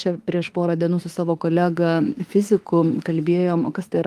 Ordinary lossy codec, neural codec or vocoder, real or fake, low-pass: Opus, 32 kbps; autoencoder, 48 kHz, 32 numbers a frame, DAC-VAE, trained on Japanese speech; fake; 14.4 kHz